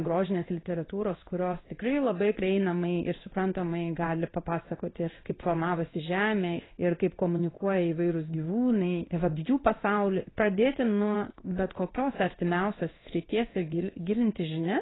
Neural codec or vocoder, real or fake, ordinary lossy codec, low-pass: codec, 16 kHz in and 24 kHz out, 1 kbps, XY-Tokenizer; fake; AAC, 16 kbps; 7.2 kHz